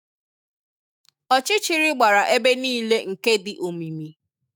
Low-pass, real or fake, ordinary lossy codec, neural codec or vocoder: 19.8 kHz; fake; none; autoencoder, 48 kHz, 128 numbers a frame, DAC-VAE, trained on Japanese speech